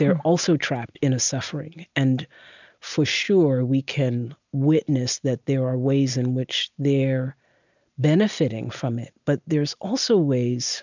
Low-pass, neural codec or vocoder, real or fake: 7.2 kHz; none; real